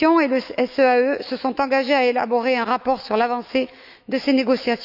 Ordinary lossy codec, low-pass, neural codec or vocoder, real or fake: none; 5.4 kHz; autoencoder, 48 kHz, 128 numbers a frame, DAC-VAE, trained on Japanese speech; fake